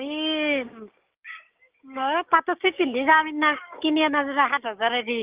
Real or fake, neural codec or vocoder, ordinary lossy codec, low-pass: fake; codec, 16 kHz, 6 kbps, DAC; Opus, 32 kbps; 3.6 kHz